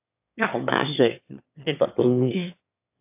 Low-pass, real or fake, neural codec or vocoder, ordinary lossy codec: 3.6 kHz; fake; autoencoder, 22.05 kHz, a latent of 192 numbers a frame, VITS, trained on one speaker; AAC, 32 kbps